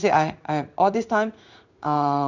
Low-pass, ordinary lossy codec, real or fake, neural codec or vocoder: 7.2 kHz; Opus, 64 kbps; fake; codec, 16 kHz in and 24 kHz out, 1 kbps, XY-Tokenizer